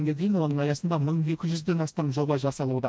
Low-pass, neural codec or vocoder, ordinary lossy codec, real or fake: none; codec, 16 kHz, 1 kbps, FreqCodec, smaller model; none; fake